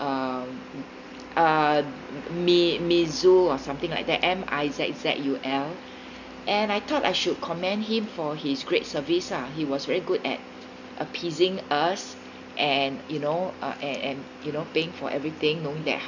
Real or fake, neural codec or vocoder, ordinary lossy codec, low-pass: real; none; none; 7.2 kHz